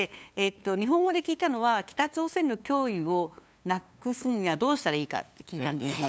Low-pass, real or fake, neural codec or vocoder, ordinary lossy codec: none; fake; codec, 16 kHz, 2 kbps, FunCodec, trained on LibriTTS, 25 frames a second; none